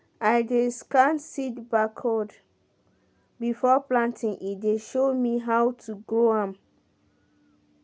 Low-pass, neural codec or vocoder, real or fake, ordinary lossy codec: none; none; real; none